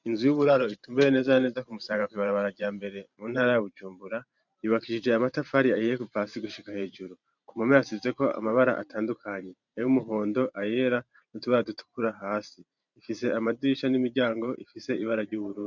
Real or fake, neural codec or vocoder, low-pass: fake; vocoder, 44.1 kHz, 128 mel bands every 512 samples, BigVGAN v2; 7.2 kHz